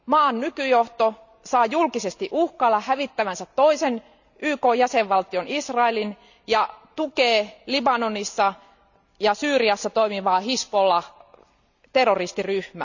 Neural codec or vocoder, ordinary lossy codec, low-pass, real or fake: none; none; 7.2 kHz; real